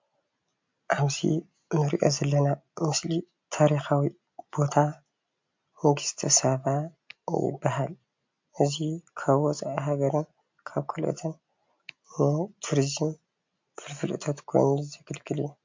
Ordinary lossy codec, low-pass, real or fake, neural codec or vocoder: MP3, 48 kbps; 7.2 kHz; real; none